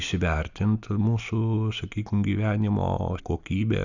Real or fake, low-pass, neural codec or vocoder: real; 7.2 kHz; none